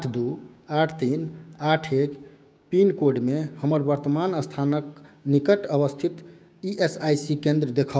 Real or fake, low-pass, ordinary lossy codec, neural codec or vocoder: fake; none; none; codec, 16 kHz, 6 kbps, DAC